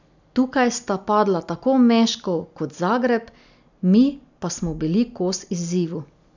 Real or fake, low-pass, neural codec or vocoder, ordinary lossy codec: real; 7.2 kHz; none; none